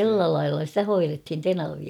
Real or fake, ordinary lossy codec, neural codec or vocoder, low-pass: real; none; none; 19.8 kHz